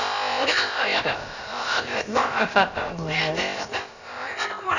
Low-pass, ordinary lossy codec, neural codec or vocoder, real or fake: 7.2 kHz; none; codec, 16 kHz, about 1 kbps, DyCAST, with the encoder's durations; fake